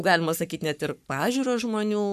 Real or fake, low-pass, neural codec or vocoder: fake; 14.4 kHz; codec, 44.1 kHz, 7.8 kbps, Pupu-Codec